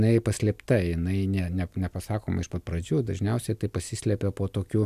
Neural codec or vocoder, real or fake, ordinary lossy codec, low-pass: none; real; AAC, 96 kbps; 14.4 kHz